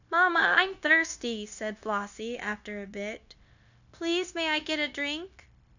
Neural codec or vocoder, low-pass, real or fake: codec, 16 kHz, 0.9 kbps, LongCat-Audio-Codec; 7.2 kHz; fake